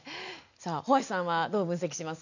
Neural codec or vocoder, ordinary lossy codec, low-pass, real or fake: none; none; 7.2 kHz; real